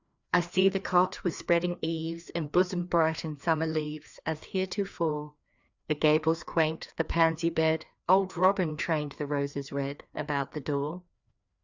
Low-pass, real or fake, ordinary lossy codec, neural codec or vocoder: 7.2 kHz; fake; Opus, 64 kbps; codec, 16 kHz, 2 kbps, FreqCodec, larger model